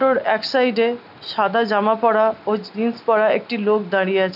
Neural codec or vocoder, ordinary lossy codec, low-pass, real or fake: none; none; 5.4 kHz; real